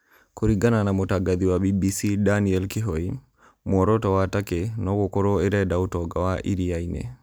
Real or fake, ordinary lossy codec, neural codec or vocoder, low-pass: real; none; none; none